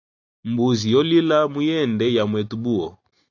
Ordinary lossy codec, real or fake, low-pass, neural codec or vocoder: AAC, 48 kbps; real; 7.2 kHz; none